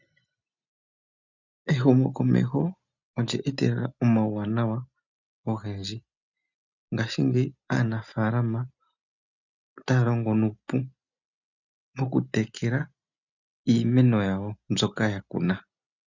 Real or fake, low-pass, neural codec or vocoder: real; 7.2 kHz; none